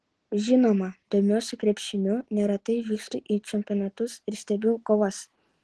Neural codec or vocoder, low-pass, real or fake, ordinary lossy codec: none; 10.8 kHz; real; Opus, 16 kbps